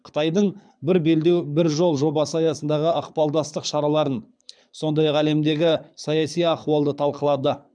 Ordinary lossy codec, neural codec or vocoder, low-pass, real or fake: none; codec, 24 kHz, 6 kbps, HILCodec; 9.9 kHz; fake